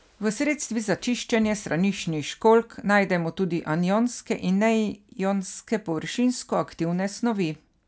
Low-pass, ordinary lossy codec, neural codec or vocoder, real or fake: none; none; none; real